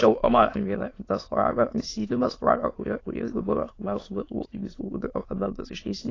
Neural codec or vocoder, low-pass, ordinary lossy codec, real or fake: autoencoder, 22.05 kHz, a latent of 192 numbers a frame, VITS, trained on many speakers; 7.2 kHz; AAC, 32 kbps; fake